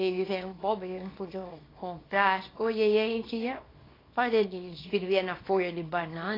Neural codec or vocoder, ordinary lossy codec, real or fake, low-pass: codec, 24 kHz, 0.9 kbps, WavTokenizer, small release; AAC, 24 kbps; fake; 5.4 kHz